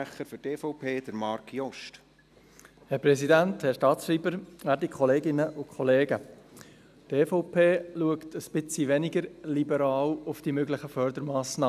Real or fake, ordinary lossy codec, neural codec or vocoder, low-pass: real; none; none; 14.4 kHz